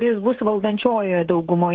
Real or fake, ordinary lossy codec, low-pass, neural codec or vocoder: fake; Opus, 16 kbps; 7.2 kHz; codec, 16 kHz, 16 kbps, FreqCodec, smaller model